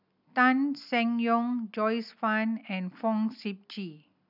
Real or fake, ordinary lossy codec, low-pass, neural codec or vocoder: real; none; 5.4 kHz; none